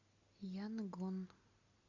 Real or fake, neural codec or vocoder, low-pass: real; none; 7.2 kHz